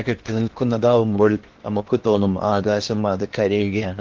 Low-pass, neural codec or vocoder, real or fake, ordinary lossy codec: 7.2 kHz; codec, 16 kHz in and 24 kHz out, 0.8 kbps, FocalCodec, streaming, 65536 codes; fake; Opus, 16 kbps